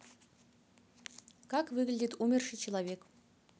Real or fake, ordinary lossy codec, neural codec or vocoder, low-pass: real; none; none; none